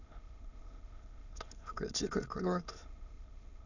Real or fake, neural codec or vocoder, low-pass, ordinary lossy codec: fake; autoencoder, 22.05 kHz, a latent of 192 numbers a frame, VITS, trained on many speakers; 7.2 kHz; none